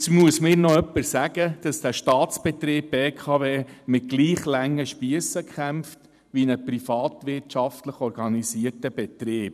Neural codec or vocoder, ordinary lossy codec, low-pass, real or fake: vocoder, 44.1 kHz, 128 mel bands every 512 samples, BigVGAN v2; none; 14.4 kHz; fake